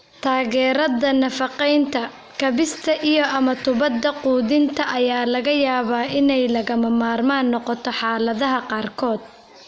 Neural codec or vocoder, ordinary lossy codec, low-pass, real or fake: none; none; none; real